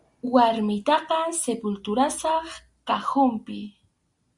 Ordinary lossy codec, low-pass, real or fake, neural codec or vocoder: Opus, 64 kbps; 10.8 kHz; real; none